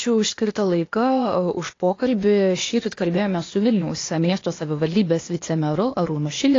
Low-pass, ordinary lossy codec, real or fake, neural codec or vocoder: 7.2 kHz; AAC, 32 kbps; fake; codec, 16 kHz, 0.8 kbps, ZipCodec